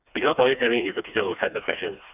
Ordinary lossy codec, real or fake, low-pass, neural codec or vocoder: none; fake; 3.6 kHz; codec, 16 kHz, 2 kbps, FreqCodec, smaller model